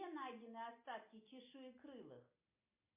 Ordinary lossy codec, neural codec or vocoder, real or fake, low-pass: MP3, 24 kbps; none; real; 3.6 kHz